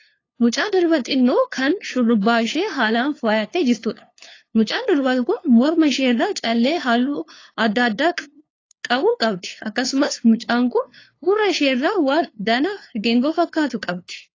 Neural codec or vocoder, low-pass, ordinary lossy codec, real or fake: codec, 16 kHz, 2 kbps, FunCodec, trained on LibriTTS, 25 frames a second; 7.2 kHz; AAC, 32 kbps; fake